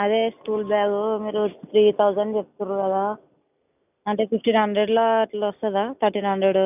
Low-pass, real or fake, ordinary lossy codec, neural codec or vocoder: 3.6 kHz; real; none; none